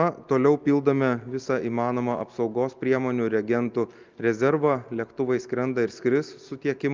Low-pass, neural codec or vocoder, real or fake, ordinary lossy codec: 7.2 kHz; none; real; Opus, 24 kbps